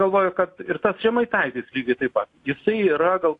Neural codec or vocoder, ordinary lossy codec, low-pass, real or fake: none; AAC, 48 kbps; 10.8 kHz; real